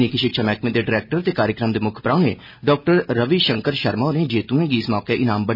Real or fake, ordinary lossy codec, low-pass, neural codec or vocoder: real; none; 5.4 kHz; none